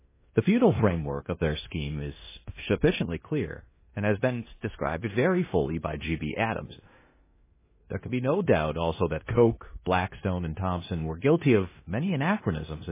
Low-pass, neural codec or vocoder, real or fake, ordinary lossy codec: 3.6 kHz; codec, 16 kHz in and 24 kHz out, 0.9 kbps, LongCat-Audio-Codec, four codebook decoder; fake; MP3, 16 kbps